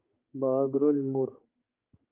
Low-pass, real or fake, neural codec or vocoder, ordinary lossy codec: 3.6 kHz; fake; codec, 16 kHz, 4 kbps, X-Codec, HuBERT features, trained on general audio; Opus, 24 kbps